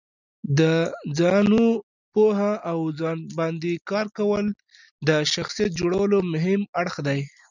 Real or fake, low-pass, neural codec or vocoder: real; 7.2 kHz; none